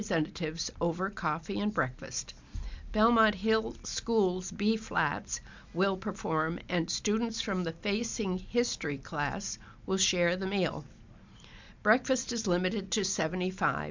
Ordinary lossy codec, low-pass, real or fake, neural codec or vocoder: MP3, 64 kbps; 7.2 kHz; real; none